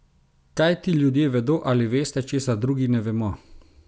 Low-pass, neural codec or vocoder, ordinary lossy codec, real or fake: none; none; none; real